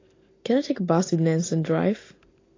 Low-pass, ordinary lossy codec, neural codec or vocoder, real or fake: 7.2 kHz; AAC, 32 kbps; none; real